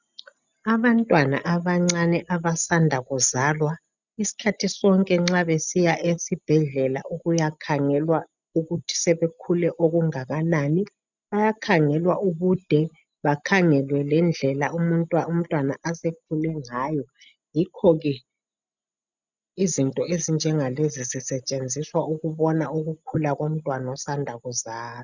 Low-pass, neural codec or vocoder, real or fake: 7.2 kHz; none; real